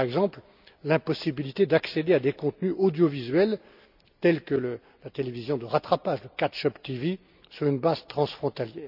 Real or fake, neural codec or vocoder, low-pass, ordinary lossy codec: real; none; 5.4 kHz; none